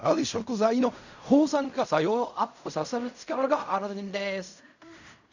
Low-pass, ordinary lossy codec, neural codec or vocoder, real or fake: 7.2 kHz; none; codec, 16 kHz in and 24 kHz out, 0.4 kbps, LongCat-Audio-Codec, fine tuned four codebook decoder; fake